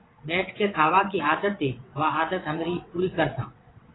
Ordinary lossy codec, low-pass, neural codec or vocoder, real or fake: AAC, 16 kbps; 7.2 kHz; vocoder, 22.05 kHz, 80 mel bands, WaveNeXt; fake